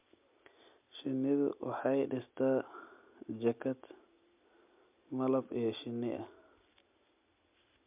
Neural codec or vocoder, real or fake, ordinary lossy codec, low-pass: none; real; MP3, 32 kbps; 3.6 kHz